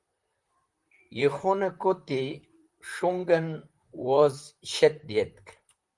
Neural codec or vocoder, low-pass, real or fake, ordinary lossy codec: vocoder, 44.1 kHz, 128 mel bands, Pupu-Vocoder; 10.8 kHz; fake; Opus, 32 kbps